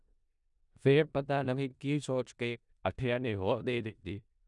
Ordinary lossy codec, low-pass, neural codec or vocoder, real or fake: none; 10.8 kHz; codec, 16 kHz in and 24 kHz out, 0.4 kbps, LongCat-Audio-Codec, four codebook decoder; fake